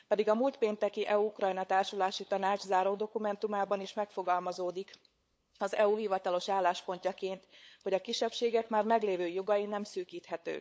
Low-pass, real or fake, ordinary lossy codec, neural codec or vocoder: none; fake; none; codec, 16 kHz, 8 kbps, FunCodec, trained on LibriTTS, 25 frames a second